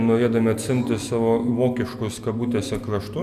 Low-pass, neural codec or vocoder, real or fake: 14.4 kHz; none; real